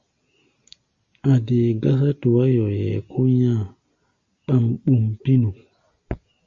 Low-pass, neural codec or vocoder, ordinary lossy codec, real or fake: 7.2 kHz; none; Opus, 64 kbps; real